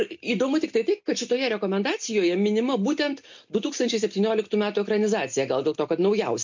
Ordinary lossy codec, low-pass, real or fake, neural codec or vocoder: MP3, 48 kbps; 7.2 kHz; real; none